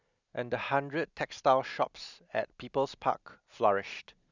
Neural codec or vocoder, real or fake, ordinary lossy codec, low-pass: none; real; none; 7.2 kHz